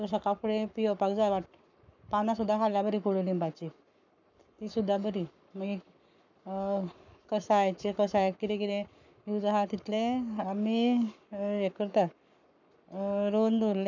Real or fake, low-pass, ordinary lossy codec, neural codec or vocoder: fake; 7.2 kHz; none; codec, 44.1 kHz, 7.8 kbps, Pupu-Codec